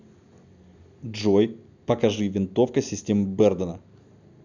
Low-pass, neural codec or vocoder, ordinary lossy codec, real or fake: 7.2 kHz; none; none; real